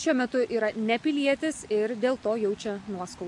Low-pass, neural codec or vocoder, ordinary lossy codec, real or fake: 10.8 kHz; none; MP3, 64 kbps; real